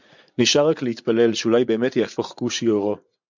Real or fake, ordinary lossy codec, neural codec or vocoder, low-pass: fake; MP3, 64 kbps; vocoder, 44.1 kHz, 80 mel bands, Vocos; 7.2 kHz